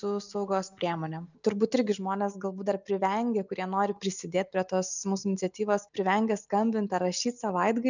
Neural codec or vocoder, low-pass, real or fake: none; 7.2 kHz; real